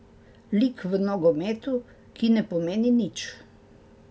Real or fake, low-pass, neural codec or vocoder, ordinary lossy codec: real; none; none; none